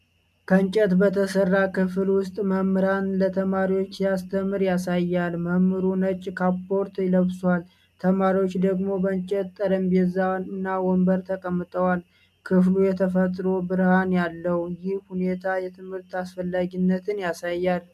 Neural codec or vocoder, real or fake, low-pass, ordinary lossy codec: none; real; 14.4 kHz; MP3, 96 kbps